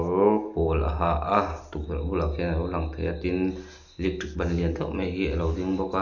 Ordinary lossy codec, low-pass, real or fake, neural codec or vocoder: none; 7.2 kHz; real; none